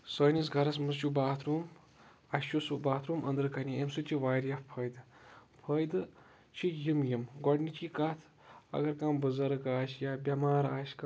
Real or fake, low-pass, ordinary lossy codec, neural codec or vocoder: real; none; none; none